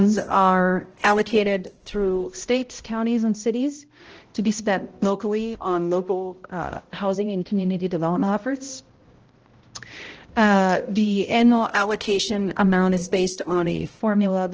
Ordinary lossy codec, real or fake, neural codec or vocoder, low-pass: Opus, 16 kbps; fake; codec, 16 kHz, 0.5 kbps, X-Codec, HuBERT features, trained on balanced general audio; 7.2 kHz